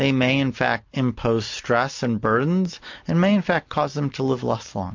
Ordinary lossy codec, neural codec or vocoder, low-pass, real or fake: MP3, 48 kbps; none; 7.2 kHz; real